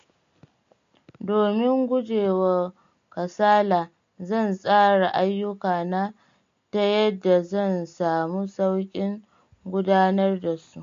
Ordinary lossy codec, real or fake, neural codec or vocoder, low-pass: MP3, 48 kbps; real; none; 7.2 kHz